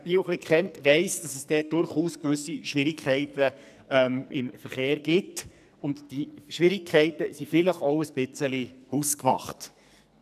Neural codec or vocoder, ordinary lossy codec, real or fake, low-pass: codec, 44.1 kHz, 2.6 kbps, SNAC; none; fake; 14.4 kHz